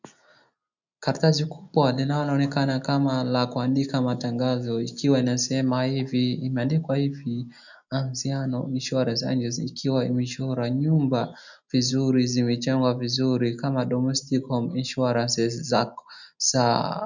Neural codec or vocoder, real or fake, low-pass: none; real; 7.2 kHz